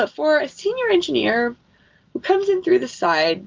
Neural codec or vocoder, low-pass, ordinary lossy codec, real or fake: none; 7.2 kHz; Opus, 32 kbps; real